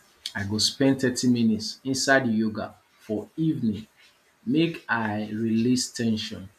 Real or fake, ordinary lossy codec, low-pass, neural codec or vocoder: real; none; 14.4 kHz; none